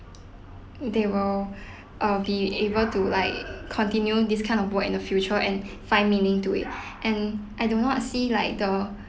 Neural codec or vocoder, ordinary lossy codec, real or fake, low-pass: none; none; real; none